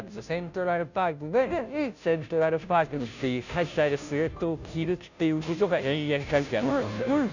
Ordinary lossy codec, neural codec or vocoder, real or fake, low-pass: none; codec, 16 kHz, 0.5 kbps, FunCodec, trained on Chinese and English, 25 frames a second; fake; 7.2 kHz